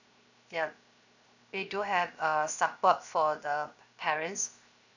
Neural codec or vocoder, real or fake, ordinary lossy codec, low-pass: codec, 16 kHz, 0.7 kbps, FocalCodec; fake; none; 7.2 kHz